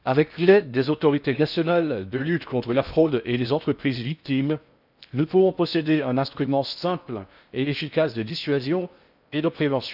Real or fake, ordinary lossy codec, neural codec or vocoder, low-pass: fake; none; codec, 16 kHz in and 24 kHz out, 0.6 kbps, FocalCodec, streaming, 4096 codes; 5.4 kHz